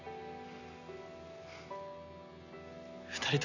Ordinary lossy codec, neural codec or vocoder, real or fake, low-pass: none; none; real; 7.2 kHz